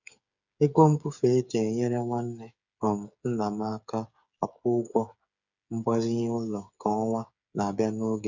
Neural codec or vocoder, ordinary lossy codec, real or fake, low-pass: codec, 16 kHz, 8 kbps, FreqCodec, smaller model; none; fake; 7.2 kHz